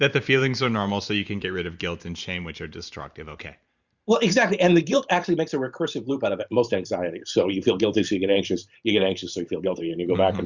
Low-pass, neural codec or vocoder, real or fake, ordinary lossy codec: 7.2 kHz; vocoder, 44.1 kHz, 128 mel bands every 512 samples, BigVGAN v2; fake; Opus, 64 kbps